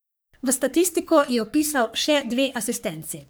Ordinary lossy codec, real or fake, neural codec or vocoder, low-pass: none; fake; codec, 44.1 kHz, 3.4 kbps, Pupu-Codec; none